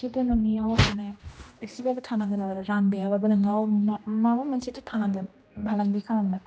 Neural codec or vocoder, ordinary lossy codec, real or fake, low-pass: codec, 16 kHz, 1 kbps, X-Codec, HuBERT features, trained on general audio; none; fake; none